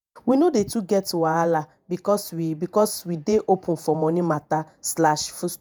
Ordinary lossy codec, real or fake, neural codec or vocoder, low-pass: none; fake; vocoder, 48 kHz, 128 mel bands, Vocos; none